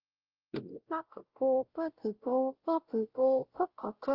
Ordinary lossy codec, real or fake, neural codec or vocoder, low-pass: Opus, 16 kbps; fake; codec, 16 kHz, 0.5 kbps, X-Codec, WavLM features, trained on Multilingual LibriSpeech; 5.4 kHz